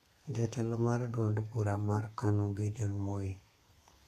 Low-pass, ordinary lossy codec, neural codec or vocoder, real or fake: 14.4 kHz; none; codec, 32 kHz, 1.9 kbps, SNAC; fake